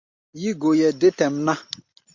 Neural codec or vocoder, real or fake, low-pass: none; real; 7.2 kHz